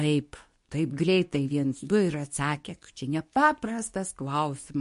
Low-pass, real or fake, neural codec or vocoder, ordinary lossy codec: 10.8 kHz; fake; codec, 24 kHz, 0.9 kbps, WavTokenizer, medium speech release version 2; MP3, 48 kbps